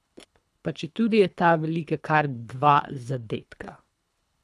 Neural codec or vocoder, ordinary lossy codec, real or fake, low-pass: codec, 24 kHz, 3 kbps, HILCodec; none; fake; none